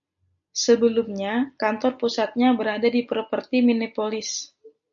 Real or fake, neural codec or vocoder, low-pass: real; none; 7.2 kHz